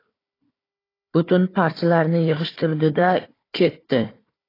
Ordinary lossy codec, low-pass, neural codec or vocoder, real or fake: AAC, 24 kbps; 5.4 kHz; codec, 16 kHz, 4 kbps, FunCodec, trained on Chinese and English, 50 frames a second; fake